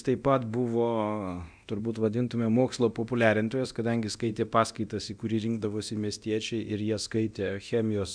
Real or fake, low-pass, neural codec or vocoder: fake; 9.9 kHz; codec, 24 kHz, 0.9 kbps, DualCodec